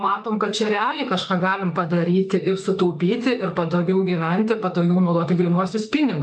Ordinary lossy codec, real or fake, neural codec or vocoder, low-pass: MP3, 96 kbps; fake; autoencoder, 48 kHz, 32 numbers a frame, DAC-VAE, trained on Japanese speech; 9.9 kHz